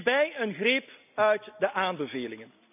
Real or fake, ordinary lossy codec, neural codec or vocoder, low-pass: real; none; none; 3.6 kHz